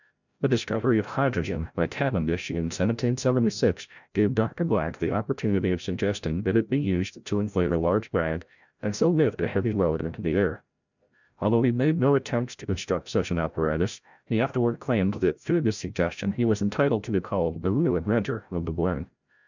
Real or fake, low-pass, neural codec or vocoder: fake; 7.2 kHz; codec, 16 kHz, 0.5 kbps, FreqCodec, larger model